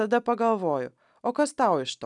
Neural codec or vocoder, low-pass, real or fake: none; 10.8 kHz; real